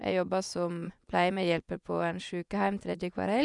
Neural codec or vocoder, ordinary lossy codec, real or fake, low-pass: vocoder, 24 kHz, 100 mel bands, Vocos; none; fake; 10.8 kHz